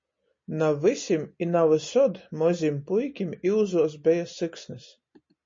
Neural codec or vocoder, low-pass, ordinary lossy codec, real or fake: none; 7.2 kHz; MP3, 32 kbps; real